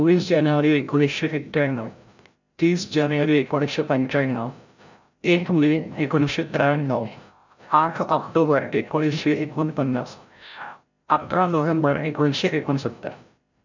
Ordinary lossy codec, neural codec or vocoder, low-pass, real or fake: none; codec, 16 kHz, 0.5 kbps, FreqCodec, larger model; 7.2 kHz; fake